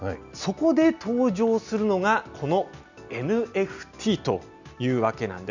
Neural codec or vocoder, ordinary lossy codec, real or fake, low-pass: none; none; real; 7.2 kHz